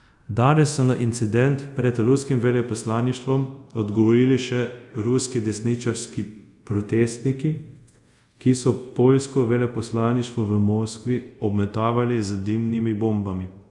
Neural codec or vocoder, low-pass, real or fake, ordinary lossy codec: codec, 24 kHz, 0.5 kbps, DualCodec; 10.8 kHz; fake; Opus, 64 kbps